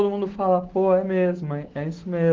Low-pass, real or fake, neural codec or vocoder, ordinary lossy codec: 7.2 kHz; real; none; Opus, 16 kbps